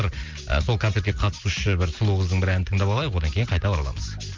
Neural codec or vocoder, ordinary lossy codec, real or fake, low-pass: none; Opus, 24 kbps; real; 7.2 kHz